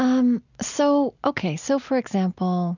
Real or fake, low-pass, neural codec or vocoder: real; 7.2 kHz; none